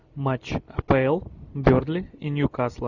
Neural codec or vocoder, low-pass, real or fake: none; 7.2 kHz; real